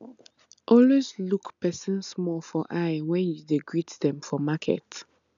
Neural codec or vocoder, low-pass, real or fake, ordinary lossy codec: none; 7.2 kHz; real; none